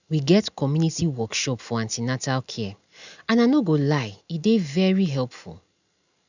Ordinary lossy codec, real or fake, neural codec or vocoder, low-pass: none; real; none; 7.2 kHz